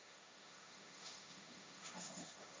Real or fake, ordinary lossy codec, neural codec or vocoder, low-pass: fake; none; codec, 16 kHz, 1.1 kbps, Voila-Tokenizer; none